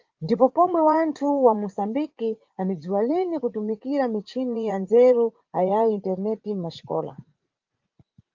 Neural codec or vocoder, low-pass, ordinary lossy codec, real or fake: vocoder, 44.1 kHz, 80 mel bands, Vocos; 7.2 kHz; Opus, 32 kbps; fake